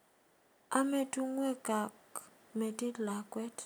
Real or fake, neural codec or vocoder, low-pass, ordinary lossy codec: real; none; none; none